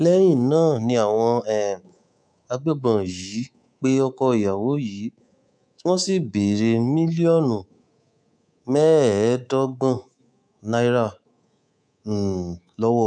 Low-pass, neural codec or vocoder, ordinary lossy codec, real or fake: 9.9 kHz; codec, 24 kHz, 3.1 kbps, DualCodec; none; fake